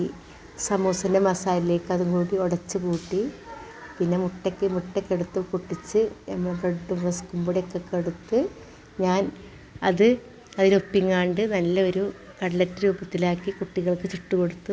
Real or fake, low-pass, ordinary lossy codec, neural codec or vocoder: real; none; none; none